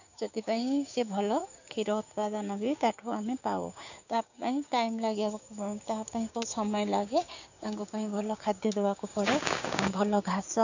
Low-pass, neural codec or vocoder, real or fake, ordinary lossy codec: 7.2 kHz; codec, 16 kHz, 6 kbps, DAC; fake; none